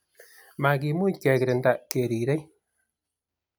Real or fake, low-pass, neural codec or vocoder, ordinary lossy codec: real; none; none; none